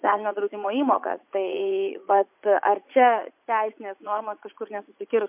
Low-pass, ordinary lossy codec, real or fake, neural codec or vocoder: 3.6 kHz; MP3, 32 kbps; fake; vocoder, 44.1 kHz, 80 mel bands, Vocos